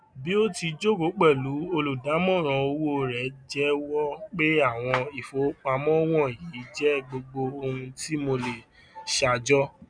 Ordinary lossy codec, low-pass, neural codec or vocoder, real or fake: none; 9.9 kHz; none; real